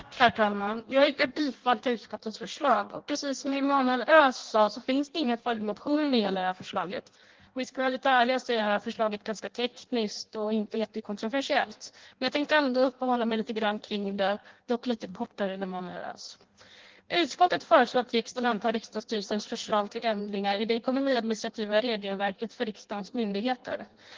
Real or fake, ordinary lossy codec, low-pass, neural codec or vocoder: fake; Opus, 16 kbps; 7.2 kHz; codec, 16 kHz in and 24 kHz out, 0.6 kbps, FireRedTTS-2 codec